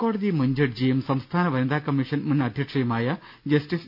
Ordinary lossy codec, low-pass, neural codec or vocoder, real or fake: none; 5.4 kHz; none; real